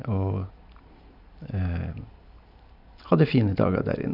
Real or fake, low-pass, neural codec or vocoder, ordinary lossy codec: real; 5.4 kHz; none; none